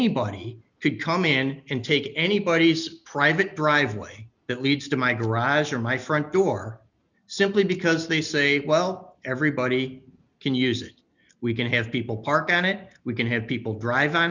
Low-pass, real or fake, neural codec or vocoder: 7.2 kHz; real; none